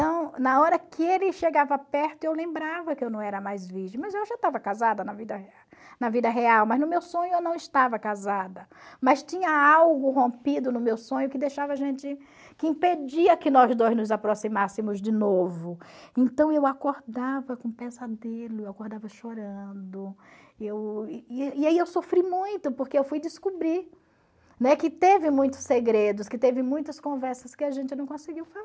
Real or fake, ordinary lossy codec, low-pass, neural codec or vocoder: real; none; none; none